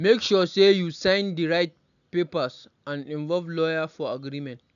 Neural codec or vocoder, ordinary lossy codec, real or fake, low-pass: none; none; real; 7.2 kHz